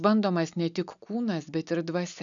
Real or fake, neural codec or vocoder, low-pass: real; none; 7.2 kHz